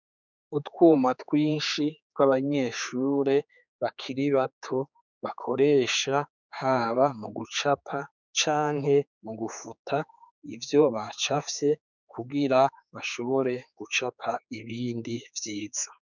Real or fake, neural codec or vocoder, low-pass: fake; codec, 16 kHz, 4 kbps, X-Codec, HuBERT features, trained on general audio; 7.2 kHz